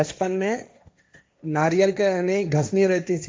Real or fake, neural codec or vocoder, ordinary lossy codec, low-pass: fake; codec, 16 kHz, 1.1 kbps, Voila-Tokenizer; none; none